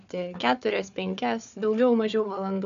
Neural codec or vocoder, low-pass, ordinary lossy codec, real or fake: codec, 16 kHz, 4 kbps, FunCodec, trained on Chinese and English, 50 frames a second; 7.2 kHz; MP3, 96 kbps; fake